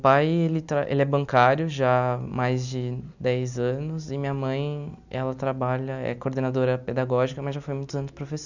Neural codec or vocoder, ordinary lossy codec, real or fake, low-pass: none; none; real; 7.2 kHz